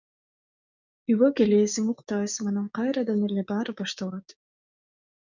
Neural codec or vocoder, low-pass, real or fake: codec, 16 kHz, 6 kbps, DAC; 7.2 kHz; fake